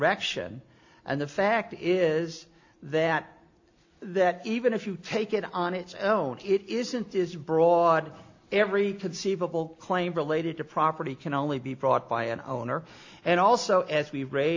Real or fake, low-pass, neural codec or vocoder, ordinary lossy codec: real; 7.2 kHz; none; AAC, 48 kbps